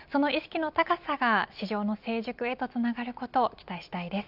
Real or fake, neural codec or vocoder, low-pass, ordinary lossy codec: real; none; 5.4 kHz; none